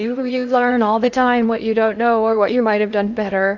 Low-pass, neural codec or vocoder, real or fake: 7.2 kHz; codec, 16 kHz in and 24 kHz out, 0.6 kbps, FocalCodec, streaming, 2048 codes; fake